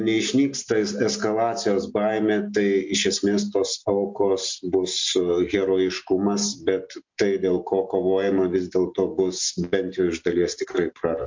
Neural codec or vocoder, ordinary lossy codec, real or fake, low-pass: none; MP3, 48 kbps; real; 7.2 kHz